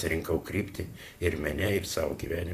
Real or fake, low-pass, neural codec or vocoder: fake; 14.4 kHz; vocoder, 44.1 kHz, 128 mel bands, Pupu-Vocoder